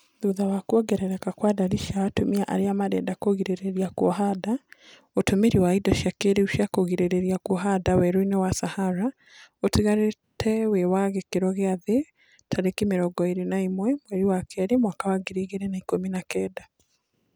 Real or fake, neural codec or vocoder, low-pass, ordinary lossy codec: real; none; none; none